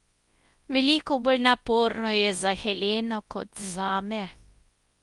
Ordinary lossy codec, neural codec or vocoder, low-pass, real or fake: Opus, 32 kbps; codec, 24 kHz, 0.9 kbps, WavTokenizer, large speech release; 10.8 kHz; fake